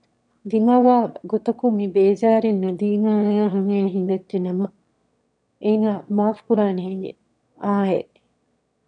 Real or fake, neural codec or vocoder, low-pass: fake; autoencoder, 22.05 kHz, a latent of 192 numbers a frame, VITS, trained on one speaker; 9.9 kHz